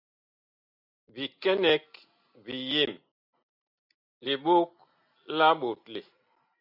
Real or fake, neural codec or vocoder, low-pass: real; none; 5.4 kHz